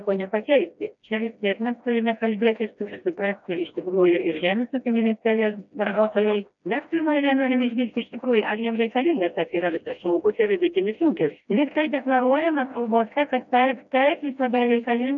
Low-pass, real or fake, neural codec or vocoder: 7.2 kHz; fake; codec, 16 kHz, 1 kbps, FreqCodec, smaller model